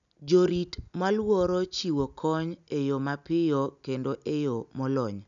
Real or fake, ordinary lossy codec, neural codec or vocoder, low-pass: real; none; none; 7.2 kHz